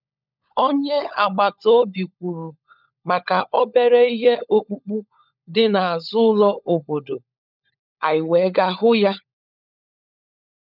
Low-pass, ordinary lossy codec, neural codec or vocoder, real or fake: 5.4 kHz; none; codec, 16 kHz, 16 kbps, FunCodec, trained on LibriTTS, 50 frames a second; fake